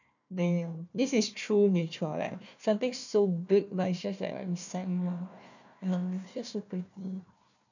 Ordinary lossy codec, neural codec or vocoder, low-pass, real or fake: none; codec, 16 kHz, 1 kbps, FunCodec, trained on Chinese and English, 50 frames a second; 7.2 kHz; fake